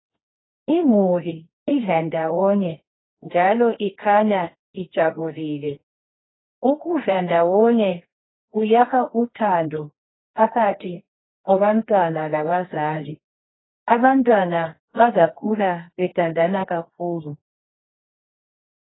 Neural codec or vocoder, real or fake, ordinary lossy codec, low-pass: codec, 24 kHz, 0.9 kbps, WavTokenizer, medium music audio release; fake; AAC, 16 kbps; 7.2 kHz